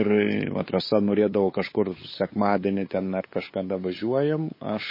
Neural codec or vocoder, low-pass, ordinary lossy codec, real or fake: codec, 16 kHz, 8 kbps, FunCodec, trained on LibriTTS, 25 frames a second; 5.4 kHz; MP3, 24 kbps; fake